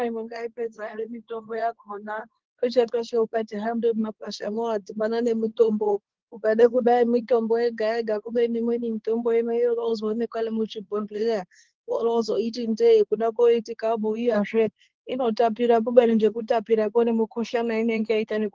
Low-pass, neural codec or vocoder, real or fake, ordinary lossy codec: 7.2 kHz; codec, 24 kHz, 0.9 kbps, WavTokenizer, medium speech release version 2; fake; Opus, 16 kbps